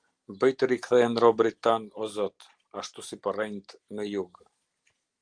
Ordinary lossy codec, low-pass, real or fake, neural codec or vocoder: Opus, 24 kbps; 9.9 kHz; real; none